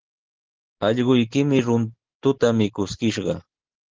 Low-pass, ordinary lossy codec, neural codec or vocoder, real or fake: 7.2 kHz; Opus, 16 kbps; none; real